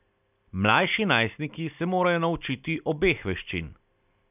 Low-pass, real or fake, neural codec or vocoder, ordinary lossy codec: 3.6 kHz; real; none; none